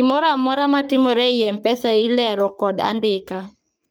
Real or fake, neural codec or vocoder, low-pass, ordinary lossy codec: fake; codec, 44.1 kHz, 3.4 kbps, Pupu-Codec; none; none